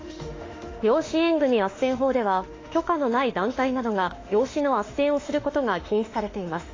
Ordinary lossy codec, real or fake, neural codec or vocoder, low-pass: AAC, 32 kbps; fake; autoencoder, 48 kHz, 32 numbers a frame, DAC-VAE, trained on Japanese speech; 7.2 kHz